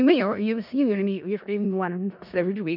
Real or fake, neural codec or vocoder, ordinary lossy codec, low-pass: fake; codec, 16 kHz in and 24 kHz out, 0.4 kbps, LongCat-Audio-Codec, four codebook decoder; none; 5.4 kHz